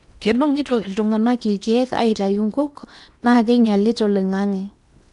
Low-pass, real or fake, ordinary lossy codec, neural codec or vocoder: 10.8 kHz; fake; none; codec, 16 kHz in and 24 kHz out, 0.8 kbps, FocalCodec, streaming, 65536 codes